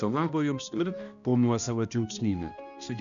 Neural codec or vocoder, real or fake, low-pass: codec, 16 kHz, 1 kbps, X-Codec, HuBERT features, trained on balanced general audio; fake; 7.2 kHz